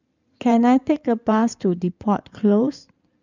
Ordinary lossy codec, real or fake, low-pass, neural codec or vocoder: none; fake; 7.2 kHz; codec, 16 kHz in and 24 kHz out, 2.2 kbps, FireRedTTS-2 codec